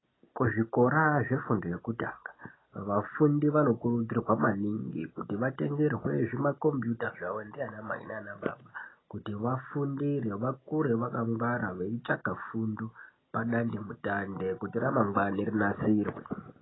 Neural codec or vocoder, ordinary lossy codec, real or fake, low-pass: none; AAC, 16 kbps; real; 7.2 kHz